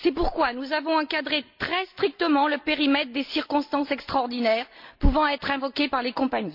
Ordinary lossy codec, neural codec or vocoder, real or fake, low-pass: MP3, 48 kbps; none; real; 5.4 kHz